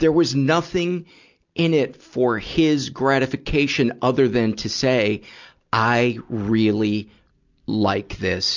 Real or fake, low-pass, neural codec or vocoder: real; 7.2 kHz; none